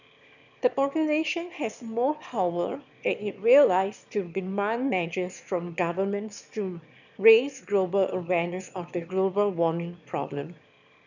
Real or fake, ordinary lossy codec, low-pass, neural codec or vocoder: fake; none; 7.2 kHz; autoencoder, 22.05 kHz, a latent of 192 numbers a frame, VITS, trained on one speaker